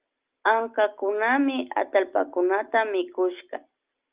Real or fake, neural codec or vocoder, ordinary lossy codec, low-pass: real; none; Opus, 32 kbps; 3.6 kHz